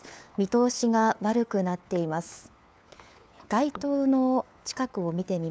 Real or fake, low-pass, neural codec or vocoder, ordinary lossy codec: fake; none; codec, 16 kHz, 4 kbps, FunCodec, trained on LibriTTS, 50 frames a second; none